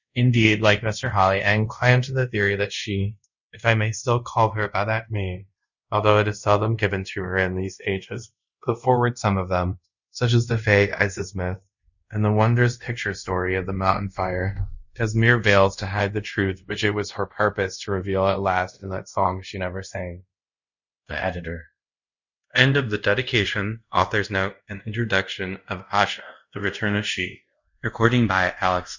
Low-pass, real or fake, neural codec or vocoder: 7.2 kHz; fake; codec, 24 kHz, 0.5 kbps, DualCodec